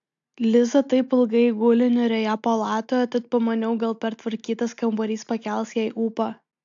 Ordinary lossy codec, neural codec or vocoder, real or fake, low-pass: AAC, 64 kbps; none; real; 7.2 kHz